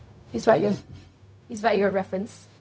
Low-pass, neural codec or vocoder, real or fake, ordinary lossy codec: none; codec, 16 kHz, 0.4 kbps, LongCat-Audio-Codec; fake; none